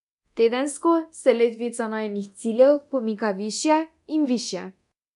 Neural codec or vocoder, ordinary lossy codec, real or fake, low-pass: codec, 24 kHz, 0.9 kbps, DualCodec; none; fake; 10.8 kHz